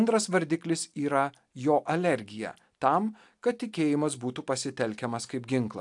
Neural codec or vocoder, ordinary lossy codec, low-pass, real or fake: none; AAC, 64 kbps; 10.8 kHz; real